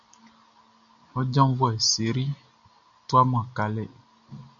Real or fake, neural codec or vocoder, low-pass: real; none; 7.2 kHz